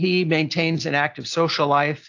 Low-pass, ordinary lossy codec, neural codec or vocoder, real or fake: 7.2 kHz; AAC, 48 kbps; none; real